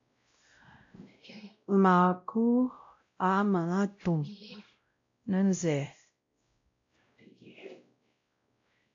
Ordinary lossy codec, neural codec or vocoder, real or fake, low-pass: AAC, 48 kbps; codec, 16 kHz, 0.5 kbps, X-Codec, WavLM features, trained on Multilingual LibriSpeech; fake; 7.2 kHz